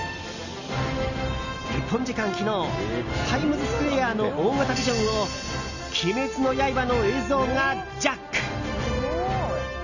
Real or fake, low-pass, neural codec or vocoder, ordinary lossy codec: real; 7.2 kHz; none; none